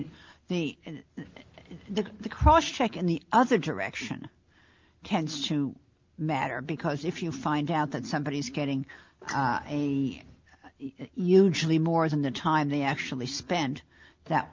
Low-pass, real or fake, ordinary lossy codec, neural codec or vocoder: 7.2 kHz; real; Opus, 32 kbps; none